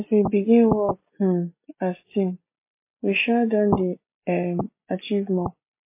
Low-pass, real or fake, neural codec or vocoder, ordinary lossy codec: 3.6 kHz; real; none; MP3, 24 kbps